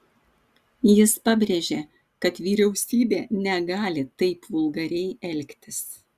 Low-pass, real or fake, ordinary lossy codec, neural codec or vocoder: 14.4 kHz; real; Opus, 64 kbps; none